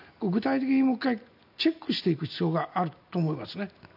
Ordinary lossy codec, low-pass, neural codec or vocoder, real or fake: none; 5.4 kHz; none; real